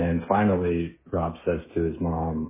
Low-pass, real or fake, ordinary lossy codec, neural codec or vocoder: 3.6 kHz; fake; MP3, 16 kbps; codec, 16 kHz, 8 kbps, FreqCodec, smaller model